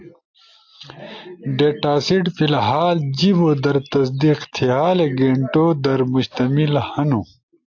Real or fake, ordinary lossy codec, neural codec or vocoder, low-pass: real; AAC, 48 kbps; none; 7.2 kHz